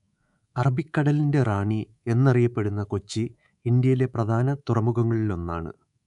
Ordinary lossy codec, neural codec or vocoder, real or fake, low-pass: none; codec, 24 kHz, 3.1 kbps, DualCodec; fake; 10.8 kHz